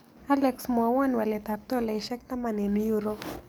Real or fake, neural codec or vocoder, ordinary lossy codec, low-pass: fake; codec, 44.1 kHz, 7.8 kbps, DAC; none; none